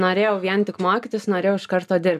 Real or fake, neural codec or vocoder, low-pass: real; none; 14.4 kHz